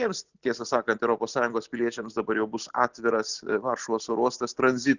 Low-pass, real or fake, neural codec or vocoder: 7.2 kHz; real; none